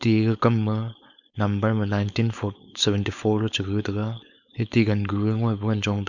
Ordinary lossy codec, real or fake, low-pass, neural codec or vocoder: none; fake; 7.2 kHz; codec, 16 kHz, 4.8 kbps, FACodec